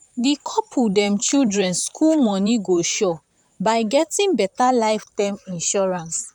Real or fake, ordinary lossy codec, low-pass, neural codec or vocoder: fake; none; 19.8 kHz; vocoder, 44.1 kHz, 128 mel bands, Pupu-Vocoder